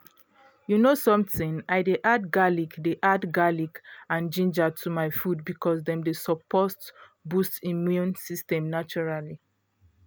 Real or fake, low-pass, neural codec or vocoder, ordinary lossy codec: real; none; none; none